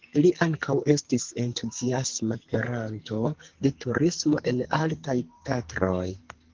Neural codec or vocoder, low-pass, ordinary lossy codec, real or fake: codec, 44.1 kHz, 2.6 kbps, SNAC; 7.2 kHz; Opus, 16 kbps; fake